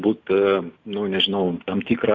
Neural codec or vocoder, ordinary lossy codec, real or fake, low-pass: none; Opus, 64 kbps; real; 7.2 kHz